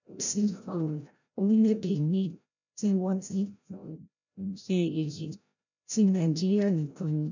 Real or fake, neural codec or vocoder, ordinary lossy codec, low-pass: fake; codec, 16 kHz, 0.5 kbps, FreqCodec, larger model; none; 7.2 kHz